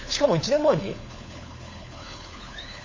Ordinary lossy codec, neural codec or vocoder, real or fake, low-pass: MP3, 32 kbps; codec, 16 kHz, 4 kbps, FunCodec, trained on LibriTTS, 50 frames a second; fake; 7.2 kHz